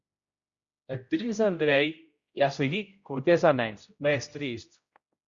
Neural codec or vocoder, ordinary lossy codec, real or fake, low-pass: codec, 16 kHz, 0.5 kbps, X-Codec, HuBERT features, trained on general audio; Opus, 64 kbps; fake; 7.2 kHz